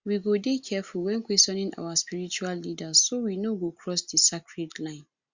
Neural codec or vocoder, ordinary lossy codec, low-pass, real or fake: none; Opus, 64 kbps; 7.2 kHz; real